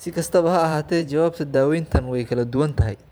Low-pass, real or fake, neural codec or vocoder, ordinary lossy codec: none; fake; vocoder, 44.1 kHz, 128 mel bands every 512 samples, BigVGAN v2; none